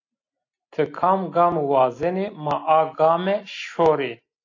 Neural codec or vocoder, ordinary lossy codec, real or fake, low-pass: none; AAC, 48 kbps; real; 7.2 kHz